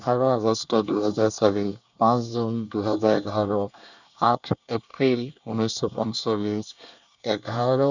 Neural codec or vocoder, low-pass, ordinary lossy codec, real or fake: codec, 24 kHz, 1 kbps, SNAC; 7.2 kHz; none; fake